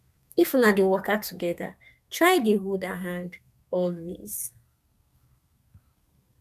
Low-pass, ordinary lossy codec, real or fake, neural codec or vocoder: 14.4 kHz; none; fake; codec, 32 kHz, 1.9 kbps, SNAC